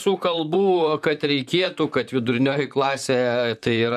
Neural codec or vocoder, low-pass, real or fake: vocoder, 44.1 kHz, 128 mel bands, Pupu-Vocoder; 14.4 kHz; fake